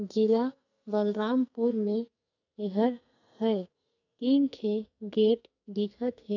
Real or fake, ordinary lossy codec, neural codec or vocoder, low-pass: fake; none; codec, 44.1 kHz, 2.6 kbps, SNAC; 7.2 kHz